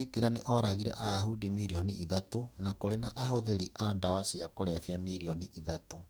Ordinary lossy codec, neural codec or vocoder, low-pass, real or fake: none; codec, 44.1 kHz, 2.6 kbps, DAC; none; fake